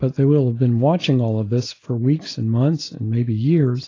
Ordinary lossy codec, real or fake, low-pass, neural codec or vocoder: AAC, 32 kbps; real; 7.2 kHz; none